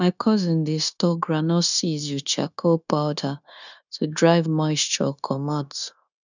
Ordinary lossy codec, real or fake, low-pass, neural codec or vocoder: none; fake; 7.2 kHz; codec, 16 kHz, 0.9 kbps, LongCat-Audio-Codec